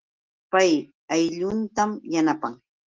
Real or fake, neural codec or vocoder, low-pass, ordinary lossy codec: real; none; 7.2 kHz; Opus, 16 kbps